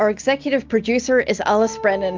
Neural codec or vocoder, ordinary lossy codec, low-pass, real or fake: none; Opus, 24 kbps; 7.2 kHz; real